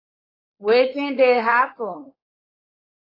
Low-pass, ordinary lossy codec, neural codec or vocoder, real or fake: 5.4 kHz; AAC, 24 kbps; codec, 16 kHz, 4.8 kbps, FACodec; fake